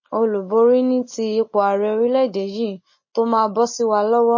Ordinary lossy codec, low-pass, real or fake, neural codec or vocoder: MP3, 32 kbps; 7.2 kHz; real; none